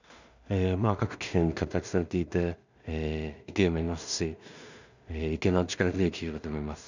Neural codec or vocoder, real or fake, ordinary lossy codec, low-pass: codec, 16 kHz in and 24 kHz out, 0.4 kbps, LongCat-Audio-Codec, two codebook decoder; fake; none; 7.2 kHz